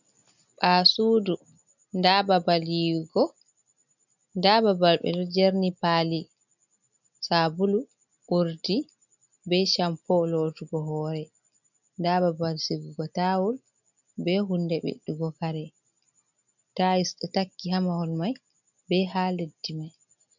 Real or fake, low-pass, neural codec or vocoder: real; 7.2 kHz; none